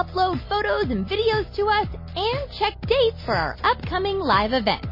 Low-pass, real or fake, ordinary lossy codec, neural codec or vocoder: 5.4 kHz; real; MP3, 24 kbps; none